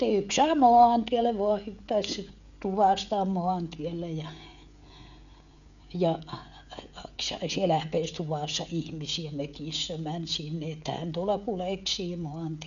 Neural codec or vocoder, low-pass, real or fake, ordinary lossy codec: codec, 16 kHz, 16 kbps, FreqCodec, smaller model; 7.2 kHz; fake; none